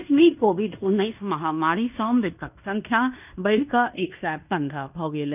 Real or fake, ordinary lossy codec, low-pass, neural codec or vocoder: fake; none; 3.6 kHz; codec, 16 kHz in and 24 kHz out, 0.9 kbps, LongCat-Audio-Codec, fine tuned four codebook decoder